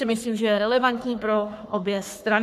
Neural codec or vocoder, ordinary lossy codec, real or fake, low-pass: codec, 44.1 kHz, 3.4 kbps, Pupu-Codec; AAC, 96 kbps; fake; 14.4 kHz